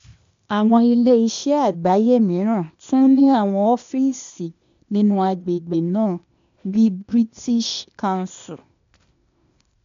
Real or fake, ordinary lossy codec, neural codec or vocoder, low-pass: fake; MP3, 64 kbps; codec, 16 kHz, 0.8 kbps, ZipCodec; 7.2 kHz